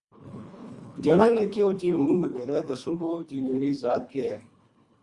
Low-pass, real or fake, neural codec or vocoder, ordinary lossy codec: 10.8 kHz; fake; codec, 24 kHz, 1.5 kbps, HILCodec; Opus, 64 kbps